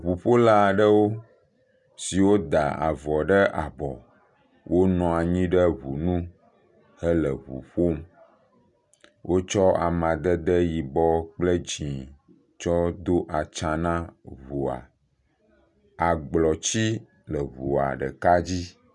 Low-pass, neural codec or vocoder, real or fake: 10.8 kHz; none; real